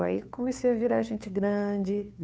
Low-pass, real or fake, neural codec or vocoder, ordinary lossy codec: none; fake; codec, 16 kHz, 2 kbps, FunCodec, trained on Chinese and English, 25 frames a second; none